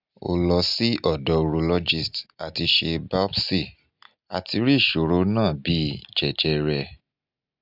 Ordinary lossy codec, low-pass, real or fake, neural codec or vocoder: none; 5.4 kHz; real; none